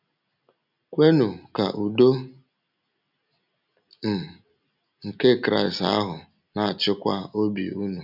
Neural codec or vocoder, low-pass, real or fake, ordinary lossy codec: none; 5.4 kHz; real; none